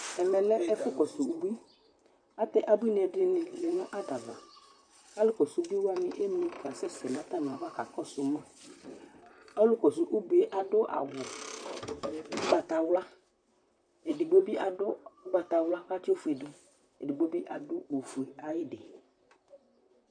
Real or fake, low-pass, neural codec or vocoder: fake; 9.9 kHz; vocoder, 44.1 kHz, 128 mel bands, Pupu-Vocoder